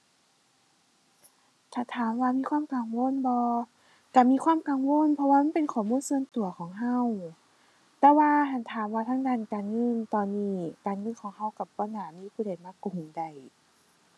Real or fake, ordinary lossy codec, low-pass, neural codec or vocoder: real; none; none; none